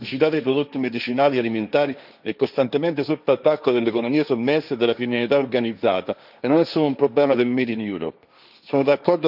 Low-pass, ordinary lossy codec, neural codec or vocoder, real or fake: 5.4 kHz; none; codec, 16 kHz, 1.1 kbps, Voila-Tokenizer; fake